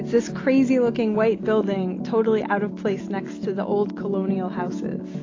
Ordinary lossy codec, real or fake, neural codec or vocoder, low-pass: AAC, 32 kbps; real; none; 7.2 kHz